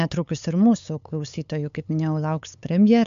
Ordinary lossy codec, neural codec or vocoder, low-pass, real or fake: MP3, 64 kbps; codec, 16 kHz, 8 kbps, FunCodec, trained on LibriTTS, 25 frames a second; 7.2 kHz; fake